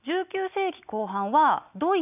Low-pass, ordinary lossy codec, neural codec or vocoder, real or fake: 3.6 kHz; none; none; real